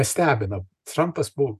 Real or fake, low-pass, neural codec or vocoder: real; 14.4 kHz; none